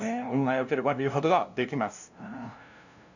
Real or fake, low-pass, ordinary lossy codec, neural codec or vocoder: fake; 7.2 kHz; none; codec, 16 kHz, 0.5 kbps, FunCodec, trained on LibriTTS, 25 frames a second